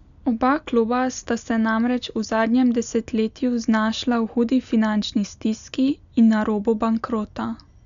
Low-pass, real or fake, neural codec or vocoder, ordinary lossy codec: 7.2 kHz; real; none; none